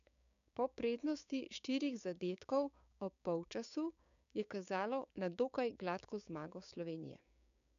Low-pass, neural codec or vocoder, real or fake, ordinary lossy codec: 7.2 kHz; codec, 16 kHz, 6 kbps, DAC; fake; none